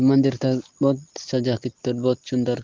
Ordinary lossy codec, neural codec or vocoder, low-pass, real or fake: Opus, 16 kbps; none; 7.2 kHz; real